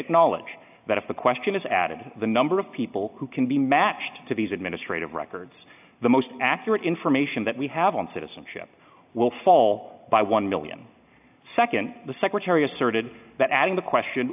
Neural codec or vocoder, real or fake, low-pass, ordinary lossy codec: none; real; 3.6 kHz; AAC, 32 kbps